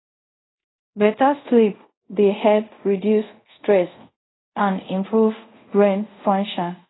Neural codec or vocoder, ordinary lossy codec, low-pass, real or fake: codec, 24 kHz, 0.5 kbps, DualCodec; AAC, 16 kbps; 7.2 kHz; fake